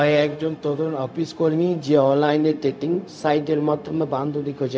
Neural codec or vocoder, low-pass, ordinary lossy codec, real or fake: codec, 16 kHz, 0.4 kbps, LongCat-Audio-Codec; none; none; fake